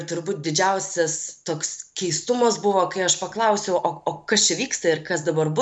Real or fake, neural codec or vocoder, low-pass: real; none; 9.9 kHz